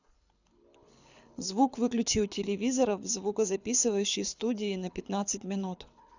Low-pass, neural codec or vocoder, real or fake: 7.2 kHz; codec, 24 kHz, 6 kbps, HILCodec; fake